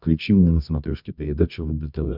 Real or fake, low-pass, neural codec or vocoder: fake; 5.4 kHz; codec, 24 kHz, 0.9 kbps, WavTokenizer, medium music audio release